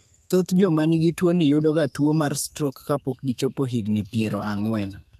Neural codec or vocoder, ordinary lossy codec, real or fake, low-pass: codec, 32 kHz, 1.9 kbps, SNAC; none; fake; 14.4 kHz